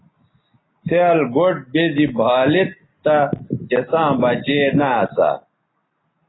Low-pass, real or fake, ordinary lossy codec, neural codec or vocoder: 7.2 kHz; real; AAC, 16 kbps; none